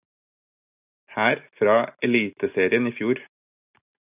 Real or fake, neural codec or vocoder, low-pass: real; none; 3.6 kHz